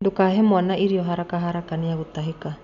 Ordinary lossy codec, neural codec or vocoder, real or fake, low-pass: none; none; real; 7.2 kHz